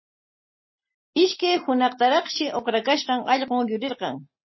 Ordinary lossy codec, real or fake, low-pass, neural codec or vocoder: MP3, 24 kbps; real; 7.2 kHz; none